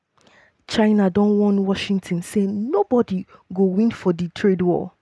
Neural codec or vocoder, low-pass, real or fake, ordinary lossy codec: none; none; real; none